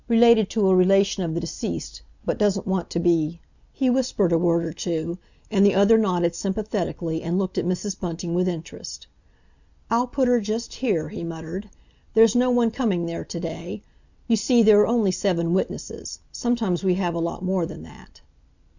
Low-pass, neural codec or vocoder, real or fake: 7.2 kHz; none; real